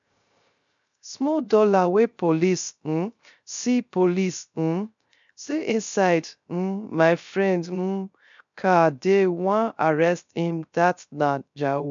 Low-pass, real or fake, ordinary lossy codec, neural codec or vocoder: 7.2 kHz; fake; MP3, 64 kbps; codec, 16 kHz, 0.3 kbps, FocalCodec